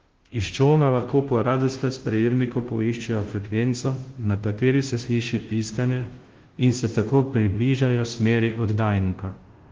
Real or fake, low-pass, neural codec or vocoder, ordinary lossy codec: fake; 7.2 kHz; codec, 16 kHz, 0.5 kbps, FunCodec, trained on Chinese and English, 25 frames a second; Opus, 16 kbps